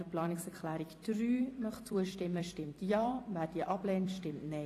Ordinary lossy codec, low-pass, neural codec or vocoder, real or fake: AAC, 48 kbps; 14.4 kHz; vocoder, 44.1 kHz, 128 mel bands every 256 samples, BigVGAN v2; fake